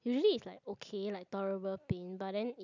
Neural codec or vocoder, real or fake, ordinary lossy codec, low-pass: none; real; none; 7.2 kHz